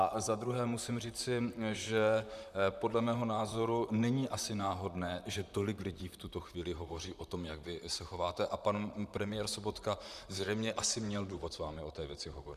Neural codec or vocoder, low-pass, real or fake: vocoder, 44.1 kHz, 128 mel bands, Pupu-Vocoder; 14.4 kHz; fake